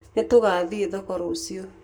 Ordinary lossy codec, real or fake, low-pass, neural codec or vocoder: none; fake; none; codec, 44.1 kHz, 7.8 kbps, Pupu-Codec